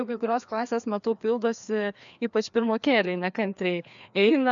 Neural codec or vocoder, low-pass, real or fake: codec, 16 kHz, 2 kbps, FreqCodec, larger model; 7.2 kHz; fake